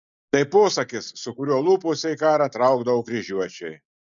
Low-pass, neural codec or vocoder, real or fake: 7.2 kHz; none; real